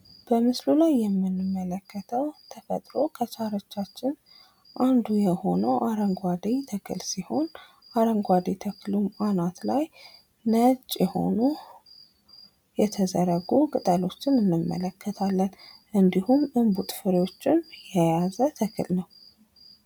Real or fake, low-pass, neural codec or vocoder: real; 19.8 kHz; none